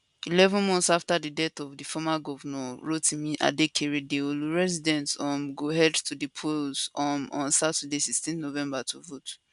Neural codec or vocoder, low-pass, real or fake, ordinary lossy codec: none; 10.8 kHz; real; none